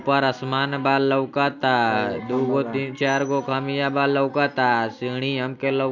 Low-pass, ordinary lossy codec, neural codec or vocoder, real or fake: 7.2 kHz; none; none; real